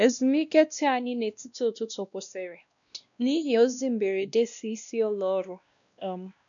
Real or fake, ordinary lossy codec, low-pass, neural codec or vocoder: fake; none; 7.2 kHz; codec, 16 kHz, 1 kbps, X-Codec, WavLM features, trained on Multilingual LibriSpeech